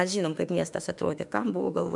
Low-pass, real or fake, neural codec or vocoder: 10.8 kHz; fake; autoencoder, 48 kHz, 32 numbers a frame, DAC-VAE, trained on Japanese speech